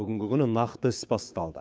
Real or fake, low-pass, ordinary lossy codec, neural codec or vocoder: fake; none; none; codec, 16 kHz, 6 kbps, DAC